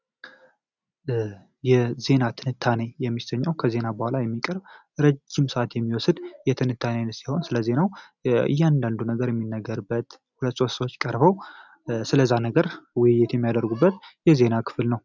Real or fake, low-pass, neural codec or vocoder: real; 7.2 kHz; none